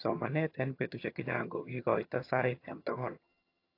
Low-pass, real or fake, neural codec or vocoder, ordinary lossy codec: 5.4 kHz; fake; vocoder, 22.05 kHz, 80 mel bands, HiFi-GAN; AAC, 32 kbps